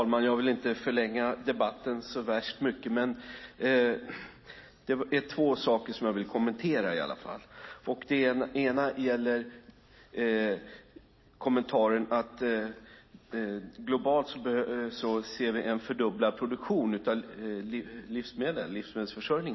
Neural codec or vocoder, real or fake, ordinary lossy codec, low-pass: none; real; MP3, 24 kbps; 7.2 kHz